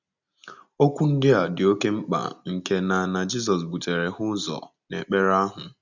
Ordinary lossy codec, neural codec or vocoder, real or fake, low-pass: none; none; real; 7.2 kHz